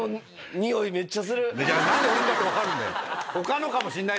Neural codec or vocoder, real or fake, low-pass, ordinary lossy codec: none; real; none; none